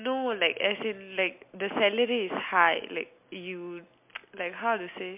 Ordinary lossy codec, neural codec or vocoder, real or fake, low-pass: MP3, 32 kbps; none; real; 3.6 kHz